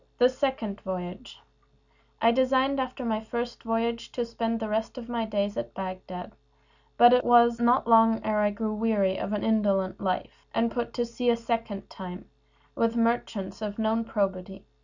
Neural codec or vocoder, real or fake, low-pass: none; real; 7.2 kHz